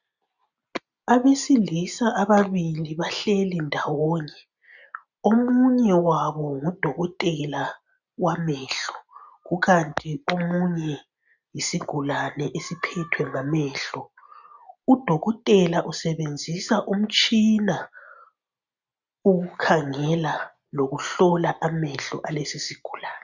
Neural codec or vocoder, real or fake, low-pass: none; real; 7.2 kHz